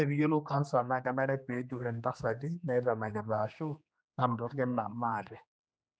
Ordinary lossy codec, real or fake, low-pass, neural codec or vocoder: none; fake; none; codec, 16 kHz, 2 kbps, X-Codec, HuBERT features, trained on general audio